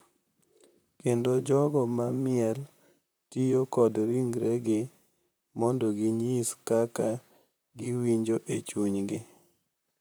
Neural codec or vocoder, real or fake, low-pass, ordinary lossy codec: vocoder, 44.1 kHz, 128 mel bands, Pupu-Vocoder; fake; none; none